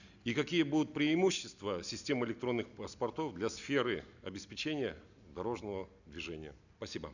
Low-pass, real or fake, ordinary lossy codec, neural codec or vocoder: 7.2 kHz; real; none; none